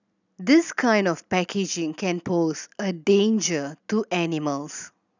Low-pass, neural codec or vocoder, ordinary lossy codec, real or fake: 7.2 kHz; none; none; real